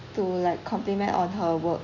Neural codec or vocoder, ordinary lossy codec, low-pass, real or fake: none; none; 7.2 kHz; real